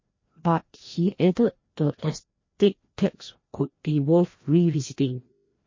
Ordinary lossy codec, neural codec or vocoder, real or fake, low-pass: MP3, 32 kbps; codec, 16 kHz, 1 kbps, FreqCodec, larger model; fake; 7.2 kHz